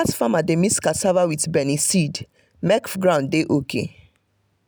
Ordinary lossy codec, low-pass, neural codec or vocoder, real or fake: none; none; none; real